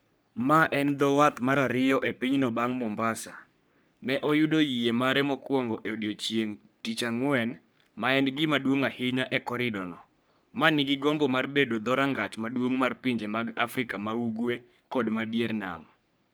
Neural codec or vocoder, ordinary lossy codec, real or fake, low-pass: codec, 44.1 kHz, 3.4 kbps, Pupu-Codec; none; fake; none